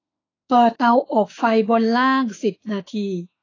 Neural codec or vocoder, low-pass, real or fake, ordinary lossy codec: autoencoder, 48 kHz, 32 numbers a frame, DAC-VAE, trained on Japanese speech; 7.2 kHz; fake; AAC, 32 kbps